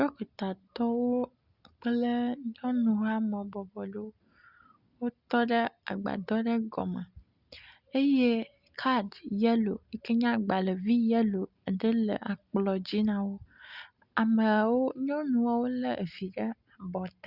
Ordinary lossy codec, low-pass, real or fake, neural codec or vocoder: Opus, 64 kbps; 5.4 kHz; real; none